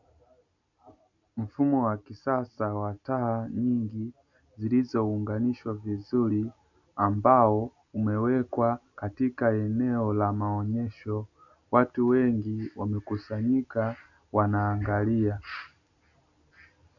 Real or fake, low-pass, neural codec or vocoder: real; 7.2 kHz; none